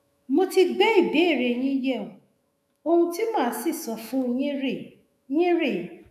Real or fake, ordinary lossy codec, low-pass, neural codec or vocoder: fake; none; 14.4 kHz; autoencoder, 48 kHz, 128 numbers a frame, DAC-VAE, trained on Japanese speech